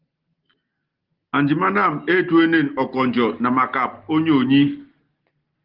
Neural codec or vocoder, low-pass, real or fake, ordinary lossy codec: none; 5.4 kHz; real; Opus, 16 kbps